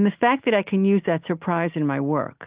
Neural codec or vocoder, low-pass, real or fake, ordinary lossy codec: none; 3.6 kHz; real; Opus, 32 kbps